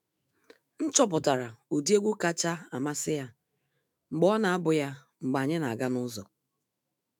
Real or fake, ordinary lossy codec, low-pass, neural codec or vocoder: fake; none; none; autoencoder, 48 kHz, 128 numbers a frame, DAC-VAE, trained on Japanese speech